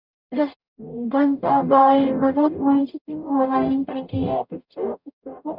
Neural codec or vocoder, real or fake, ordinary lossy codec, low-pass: codec, 44.1 kHz, 0.9 kbps, DAC; fake; none; 5.4 kHz